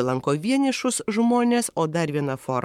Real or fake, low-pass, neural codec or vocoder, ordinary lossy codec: fake; 19.8 kHz; codec, 44.1 kHz, 7.8 kbps, Pupu-Codec; MP3, 96 kbps